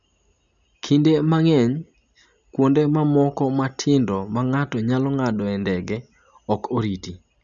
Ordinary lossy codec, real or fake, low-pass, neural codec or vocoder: none; real; 7.2 kHz; none